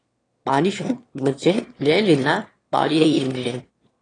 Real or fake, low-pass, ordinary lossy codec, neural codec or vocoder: fake; 9.9 kHz; AAC, 32 kbps; autoencoder, 22.05 kHz, a latent of 192 numbers a frame, VITS, trained on one speaker